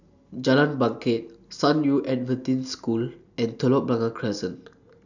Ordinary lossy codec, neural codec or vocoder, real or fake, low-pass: none; none; real; 7.2 kHz